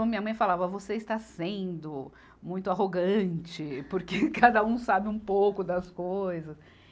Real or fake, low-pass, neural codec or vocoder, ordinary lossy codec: real; none; none; none